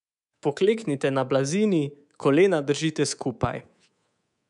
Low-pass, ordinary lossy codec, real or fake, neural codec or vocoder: 10.8 kHz; none; fake; codec, 24 kHz, 3.1 kbps, DualCodec